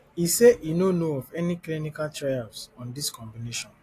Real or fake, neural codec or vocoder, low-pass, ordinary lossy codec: real; none; 14.4 kHz; AAC, 48 kbps